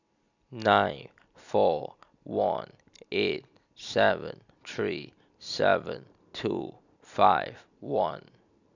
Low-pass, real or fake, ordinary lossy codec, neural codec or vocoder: 7.2 kHz; real; none; none